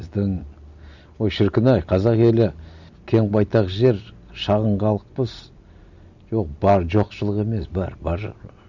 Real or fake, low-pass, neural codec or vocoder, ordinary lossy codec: real; 7.2 kHz; none; none